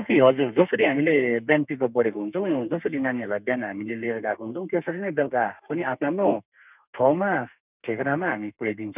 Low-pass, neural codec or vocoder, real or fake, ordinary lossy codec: 3.6 kHz; codec, 32 kHz, 1.9 kbps, SNAC; fake; none